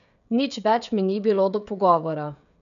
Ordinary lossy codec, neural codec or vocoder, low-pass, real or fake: none; codec, 16 kHz, 16 kbps, FreqCodec, smaller model; 7.2 kHz; fake